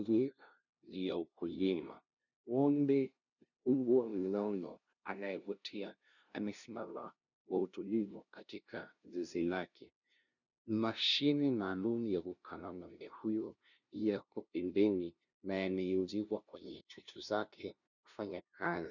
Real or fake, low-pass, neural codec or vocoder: fake; 7.2 kHz; codec, 16 kHz, 0.5 kbps, FunCodec, trained on LibriTTS, 25 frames a second